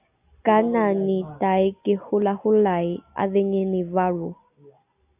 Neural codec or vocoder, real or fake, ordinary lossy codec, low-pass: none; real; AAC, 32 kbps; 3.6 kHz